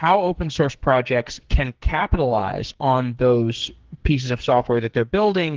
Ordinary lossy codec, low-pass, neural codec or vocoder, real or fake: Opus, 16 kbps; 7.2 kHz; codec, 44.1 kHz, 2.6 kbps, SNAC; fake